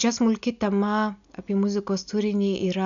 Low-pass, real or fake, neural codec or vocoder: 7.2 kHz; real; none